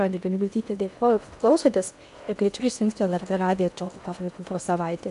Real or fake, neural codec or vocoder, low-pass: fake; codec, 16 kHz in and 24 kHz out, 0.6 kbps, FocalCodec, streaming, 2048 codes; 10.8 kHz